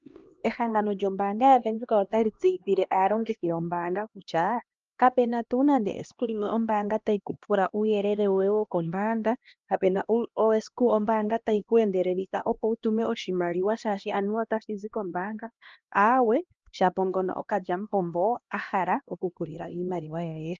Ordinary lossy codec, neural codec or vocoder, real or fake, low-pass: Opus, 24 kbps; codec, 16 kHz, 1 kbps, X-Codec, HuBERT features, trained on LibriSpeech; fake; 7.2 kHz